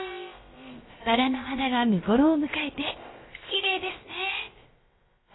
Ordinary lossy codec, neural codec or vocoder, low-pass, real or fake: AAC, 16 kbps; codec, 16 kHz, about 1 kbps, DyCAST, with the encoder's durations; 7.2 kHz; fake